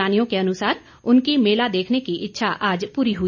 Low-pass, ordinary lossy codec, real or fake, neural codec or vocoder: 7.2 kHz; none; real; none